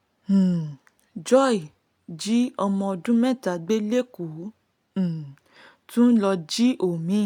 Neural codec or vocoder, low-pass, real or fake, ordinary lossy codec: none; 19.8 kHz; real; none